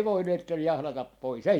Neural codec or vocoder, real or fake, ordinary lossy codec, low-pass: none; real; MP3, 96 kbps; 19.8 kHz